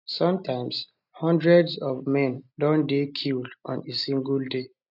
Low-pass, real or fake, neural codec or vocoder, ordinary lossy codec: 5.4 kHz; real; none; none